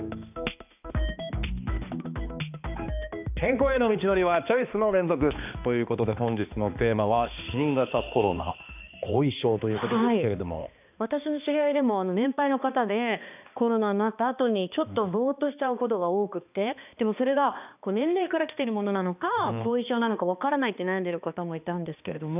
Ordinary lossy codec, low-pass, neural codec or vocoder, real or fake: none; 3.6 kHz; codec, 16 kHz, 2 kbps, X-Codec, HuBERT features, trained on balanced general audio; fake